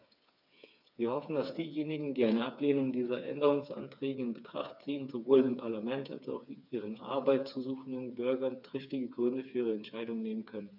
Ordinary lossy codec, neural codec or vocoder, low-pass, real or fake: none; codec, 16 kHz, 4 kbps, FreqCodec, smaller model; 5.4 kHz; fake